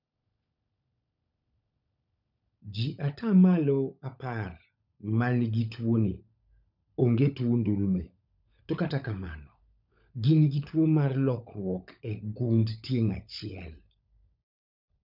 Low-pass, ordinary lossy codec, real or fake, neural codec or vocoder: 5.4 kHz; none; fake; codec, 16 kHz, 16 kbps, FunCodec, trained on LibriTTS, 50 frames a second